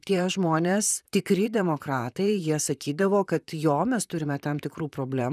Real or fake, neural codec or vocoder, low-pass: fake; vocoder, 44.1 kHz, 128 mel bands, Pupu-Vocoder; 14.4 kHz